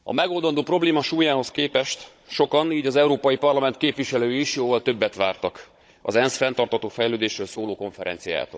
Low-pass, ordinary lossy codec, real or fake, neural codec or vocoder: none; none; fake; codec, 16 kHz, 16 kbps, FunCodec, trained on Chinese and English, 50 frames a second